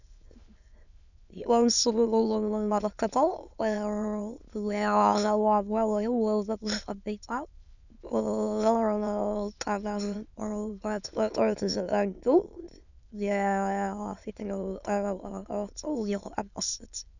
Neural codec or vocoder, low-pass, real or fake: autoencoder, 22.05 kHz, a latent of 192 numbers a frame, VITS, trained on many speakers; 7.2 kHz; fake